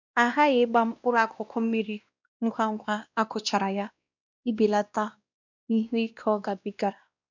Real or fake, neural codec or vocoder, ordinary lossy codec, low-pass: fake; codec, 16 kHz, 1 kbps, X-Codec, WavLM features, trained on Multilingual LibriSpeech; none; 7.2 kHz